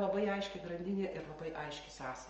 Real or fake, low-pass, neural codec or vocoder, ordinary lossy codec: real; 7.2 kHz; none; Opus, 24 kbps